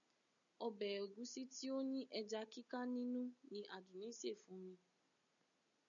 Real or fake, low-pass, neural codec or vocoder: real; 7.2 kHz; none